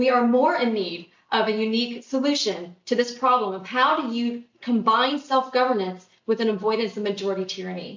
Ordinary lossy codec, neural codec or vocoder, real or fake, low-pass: MP3, 48 kbps; none; real; 7.2 kHz